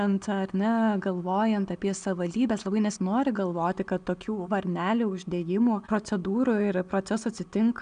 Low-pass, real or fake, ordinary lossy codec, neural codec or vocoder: 9.9 kHz; real; MP3, 96 kbps; none